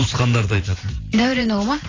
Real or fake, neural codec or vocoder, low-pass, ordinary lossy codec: fake; vocoder, 44.1 kHz, 128 mel bands every 512 samples, BigVGAN v2; 7.2 kHz; AAC, 32 kbps